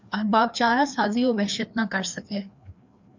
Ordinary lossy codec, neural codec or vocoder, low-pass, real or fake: MP3, 64 kbps; codec, 16 kHz, 2 kbps, FreqCodec, larger model; 7.2 kHz; fake